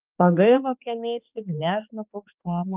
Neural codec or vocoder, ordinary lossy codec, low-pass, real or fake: codec, 16 kHz, 2 kbps, X-Codec, HuBERT features, trained on balanced general audio; Opus, 32 kbps; 3.6 kHz; fake